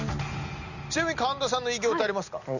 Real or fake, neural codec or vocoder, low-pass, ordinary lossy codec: real; none; 7.2 kHz; none